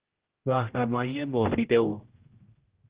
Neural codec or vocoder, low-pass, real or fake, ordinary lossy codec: codec, 16 kHz, 0.5 kbps, X-Codec, HuBERT features, trained on general audio; 3.6 kHz; fake; Opus, 16 kbps